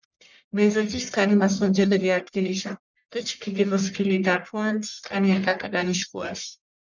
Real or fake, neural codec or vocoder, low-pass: fake; codec, 44.1 kHz, 1.7 kbps, Pupu-Codec; 7.2 kHz